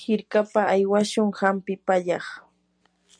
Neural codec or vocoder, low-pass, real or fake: none; 9.9 kHz; real